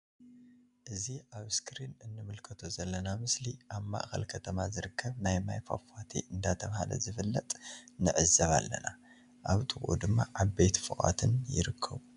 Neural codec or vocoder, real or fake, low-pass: none; real; 10.8 kHz